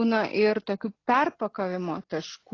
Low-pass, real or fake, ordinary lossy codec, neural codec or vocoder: 7.2 kHz; real; AAC, 32 kbps; none